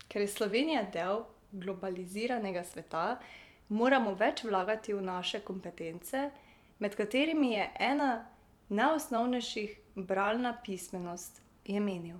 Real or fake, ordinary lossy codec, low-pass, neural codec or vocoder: fake; none; 19.8 kHz; vocoder, 44.1 kHz, 128 mel bands every 512 samples, BigVGAN v2